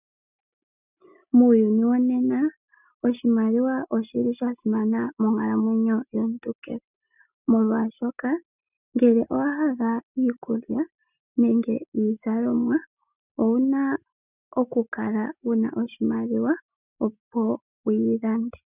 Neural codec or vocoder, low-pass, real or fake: none; 3.6 kHz; real